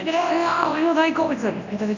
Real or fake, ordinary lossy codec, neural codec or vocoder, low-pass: fake; none; codec, 24 kHz, 0.9 kbps, WavTokenizer, large speech release; 7.2 kHz